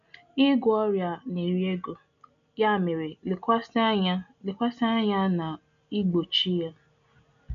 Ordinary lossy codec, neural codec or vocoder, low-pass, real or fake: none; none; 7.2 kHz; real